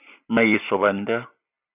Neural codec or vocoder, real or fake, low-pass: none; real; 3.6 kHz